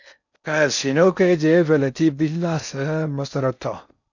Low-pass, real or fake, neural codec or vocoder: 7.2 kHz; fake; codec, 16 kHz in and 24 kHz out, 0.6 kbps, FocalCodec, streaming, 4096 codes